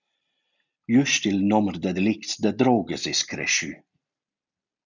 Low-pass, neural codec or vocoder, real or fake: 7.2 kHz; none; real